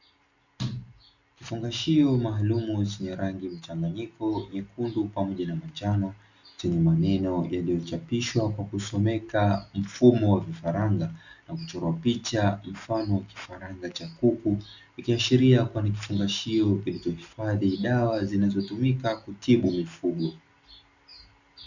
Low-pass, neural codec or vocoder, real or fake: 7.2 kHz; none; real